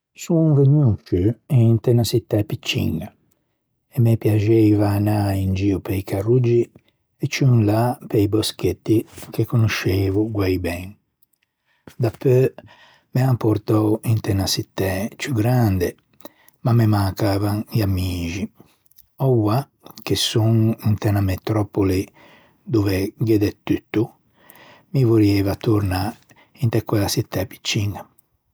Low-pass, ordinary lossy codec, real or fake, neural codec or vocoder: none; none; real; none